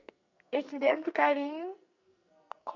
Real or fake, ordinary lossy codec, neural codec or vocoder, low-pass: fake; none; codec, 44.1 kHz, 2.6 kbps, SNAC; 7.2 kHz